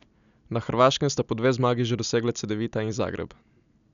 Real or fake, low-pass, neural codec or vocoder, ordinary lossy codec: real; 7.2 kHz; none; none